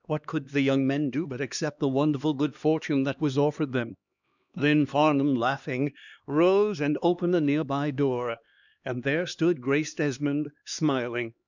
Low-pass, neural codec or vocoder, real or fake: 7.2 kHz; codec, 16 kHz, 2 kbps, X-Codec, HuBERT features, trained on LibriSpeech; fake